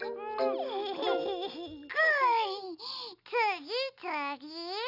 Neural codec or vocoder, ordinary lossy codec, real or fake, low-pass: none; none; real; 5.4 kHz